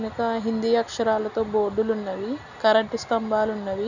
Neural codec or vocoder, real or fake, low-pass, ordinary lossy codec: none; real; 7.2 kHz; none